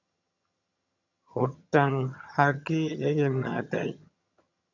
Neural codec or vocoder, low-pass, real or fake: vocoder, 22.05 kHz, 80 mel bands, HiFi-GAN; 7.2 kHz; fake